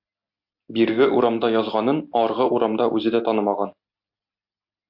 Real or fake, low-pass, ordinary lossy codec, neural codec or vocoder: real; 5.4 kHz; MP3, 48 kbps; none